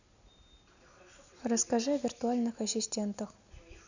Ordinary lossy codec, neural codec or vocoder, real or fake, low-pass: none; none; real; 7.2 kHz